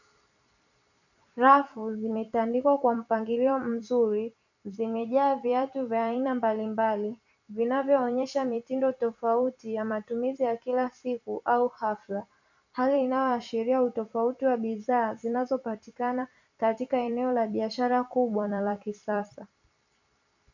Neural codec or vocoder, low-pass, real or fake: vocoder, 44.1 kHz, 128 mel bands every 256 samples, BigVGAN v2; 7.2 kHz; fake